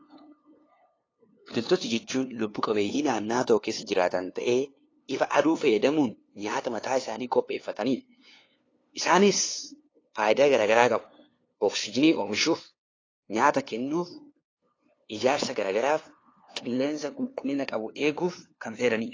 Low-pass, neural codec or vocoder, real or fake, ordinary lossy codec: 7.2 kHz; codec, 16 kHz, 2 kbps, FunCodec, trained on LibriTTS, 25 frames a second; fake; AAC, 32 kbps